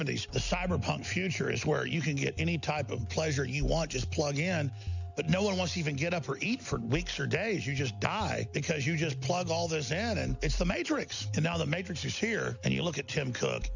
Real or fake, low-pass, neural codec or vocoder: real; 7.2 kHz; none